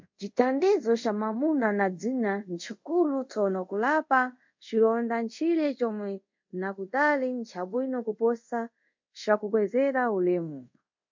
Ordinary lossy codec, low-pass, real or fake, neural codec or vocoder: MP3, 48 kbps; 7.2 kHz; fake; codec, 24 kHz, 0.5 kbps, DualCodec